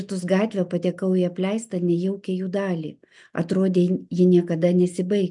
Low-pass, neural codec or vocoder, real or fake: 10.8 kHz; none; real